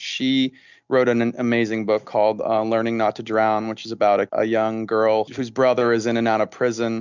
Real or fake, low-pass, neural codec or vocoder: real; 7.2 kHz; none